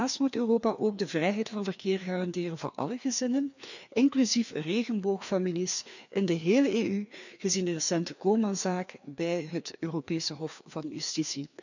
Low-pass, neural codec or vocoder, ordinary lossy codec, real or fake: 7.2 kHz; codec, 16 kHz, 2 kbps, FreqCodec, larger model; none; fake